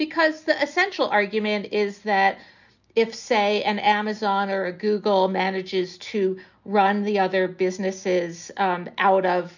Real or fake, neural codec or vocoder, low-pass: real; none; 7.2 kHz